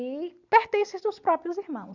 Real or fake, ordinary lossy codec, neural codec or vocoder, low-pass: real; none; none; 7.2 kHz